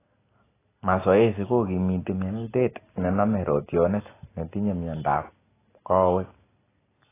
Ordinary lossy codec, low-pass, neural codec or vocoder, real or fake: AAC, 16 kbps; 3.6 kHz; none; real